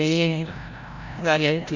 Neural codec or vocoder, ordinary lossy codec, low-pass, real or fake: codec, 16 kHz, 0.5 kbps, FreqCodec, larger model; Opus, 64 kbps; 7.2 kHz; fake